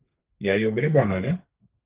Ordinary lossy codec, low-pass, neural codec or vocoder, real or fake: Opus, 16 kbps; 3.6 kHz; codec, 32 kHz, 1.9 kbps, SNAC; fake